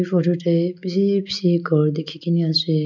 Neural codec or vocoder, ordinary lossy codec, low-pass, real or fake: none; none; 7.2 kHz; real